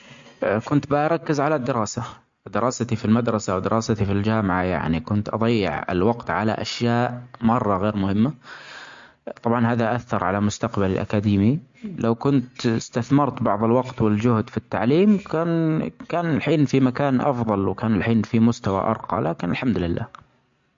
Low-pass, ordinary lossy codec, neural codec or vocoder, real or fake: 7.2 kHz; MP3, 48 kbps; none; real